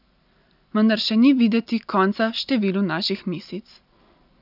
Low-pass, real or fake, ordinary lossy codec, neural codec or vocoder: 5.4 kHz; real; none; none